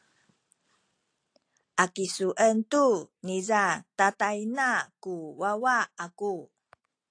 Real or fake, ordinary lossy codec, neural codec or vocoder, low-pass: real; AAC, 48 kbps; none; 9.9 kHz